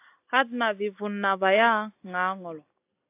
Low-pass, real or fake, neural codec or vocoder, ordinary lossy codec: 3.6 kHz; real; none; AAC, 24 kbps